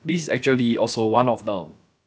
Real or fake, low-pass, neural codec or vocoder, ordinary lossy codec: fake; none; codec, 16 kHz, about 1 kbps, DyCAST, with the encoder's durations; none